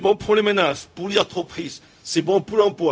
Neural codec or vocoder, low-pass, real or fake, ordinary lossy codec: codec, 16 kHz, 0.4 kbps, LongCat-Audio-Codec; none; fake; none